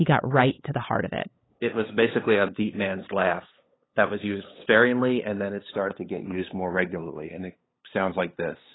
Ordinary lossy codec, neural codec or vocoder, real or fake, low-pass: AAC, 16 kbps; codec, 16 kHz, 8 kbps, FunCodec, trained on LibriTTS, 25 frames a second; fake; 7.2 kHz